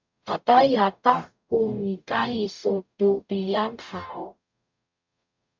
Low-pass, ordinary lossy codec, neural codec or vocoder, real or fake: 7.2 kHz; MP3, 64 kbps; codec, 44.1 kHz, 0.9 kbps, DAC; fake